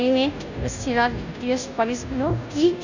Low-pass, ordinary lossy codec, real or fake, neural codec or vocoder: 7.2 kHz; none; fake; codec, 16 kHz, 0.5 kbps, FunCodec, trained on Chinese and English, 25 frames a second